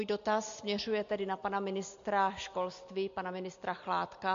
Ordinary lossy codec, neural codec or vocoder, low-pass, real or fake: MP3, 48 kbps; none; 7.2 kHz; real